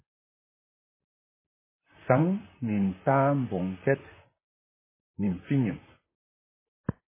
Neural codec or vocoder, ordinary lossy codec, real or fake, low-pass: vocoder, 44.1 kHz, 128 mel bands, Pupu-Vocoder; MP3, 16 kbps; fake; 3.6 kHz